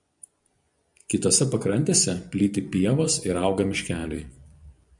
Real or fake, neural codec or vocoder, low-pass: fake; vocoder, 24 kHz, 100 mel bands, Vocos; 10.8 kHz